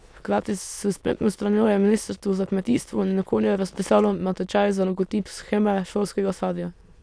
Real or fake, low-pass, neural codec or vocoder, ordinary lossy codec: fake; none; autoencoder, 22.05 kHz, a latent of 192 numbers a frame, VITS, trained on many speakers; none